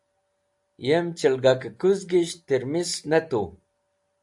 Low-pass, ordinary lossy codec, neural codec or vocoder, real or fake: 10.8 kHz; AAC, 64 kbps; none; real